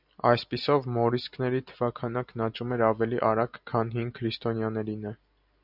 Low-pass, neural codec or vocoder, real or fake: 5.4 kHz; none; real